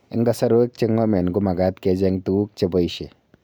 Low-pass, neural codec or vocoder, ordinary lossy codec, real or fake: none; none; none; real